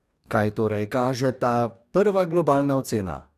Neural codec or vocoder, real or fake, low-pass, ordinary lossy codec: codec, 44.1 kHz, 2.6 kbps, DAC; fake; 14.4 kHz; none